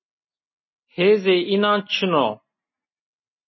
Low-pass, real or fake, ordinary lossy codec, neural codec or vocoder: 7.2 kHz; real; MP3, 24 kbps; none